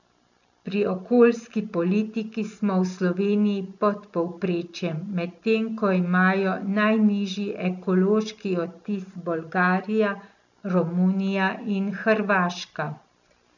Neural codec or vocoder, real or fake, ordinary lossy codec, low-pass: none; real; none; 7.2 kHz